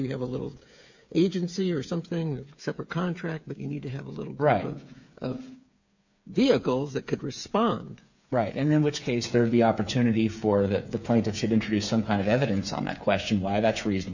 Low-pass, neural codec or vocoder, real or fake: 7.2 kHz; codec, 16 kHz, 8 kbps, FreqCodec, smaller model; fake